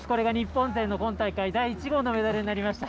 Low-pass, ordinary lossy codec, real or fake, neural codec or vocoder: none; none; real; none